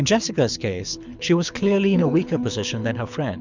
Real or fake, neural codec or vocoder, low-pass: fake; codec, 24 kHz, 6 kbps, HILCodec; 7.2 kHz